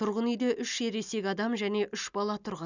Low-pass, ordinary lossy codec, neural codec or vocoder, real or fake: 7.2 kHz; none; none; real